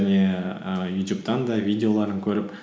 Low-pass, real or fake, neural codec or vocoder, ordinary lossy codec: none; real; none; none